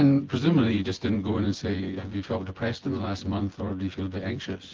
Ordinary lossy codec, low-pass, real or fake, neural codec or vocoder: Opus, 16 kbps; 7.2 kHz; fake; vocoder, 24 kHz, 100 mel bands, Vocos